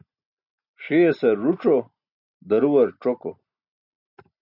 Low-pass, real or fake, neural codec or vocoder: 5.4 kHz; real; none